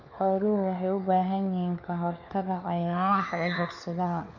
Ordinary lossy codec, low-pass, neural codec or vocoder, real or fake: none; 7.2 kHz; codec, 16 kHz, 4 kbps, FunCodec, trained on LibriTTS, 50 frames a second; fake